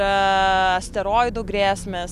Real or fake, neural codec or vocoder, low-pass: real; none; 14.4 kHz